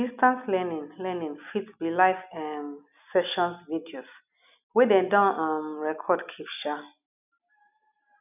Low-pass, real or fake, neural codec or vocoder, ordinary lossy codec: 3.6 kHz; real; none; none